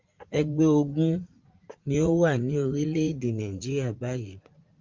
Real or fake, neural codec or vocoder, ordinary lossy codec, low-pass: fake; vocoder, 44.1 kHz, 80 mel bands, Vocos; Opus, 32 kbps; 7.2 kHz